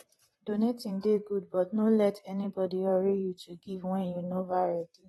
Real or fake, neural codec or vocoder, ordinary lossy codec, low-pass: fake; vocoder, 44.1 kHz, 128 mel bands, Pupu-Vocoder; AAC, 32 kbps; 19.8 kHz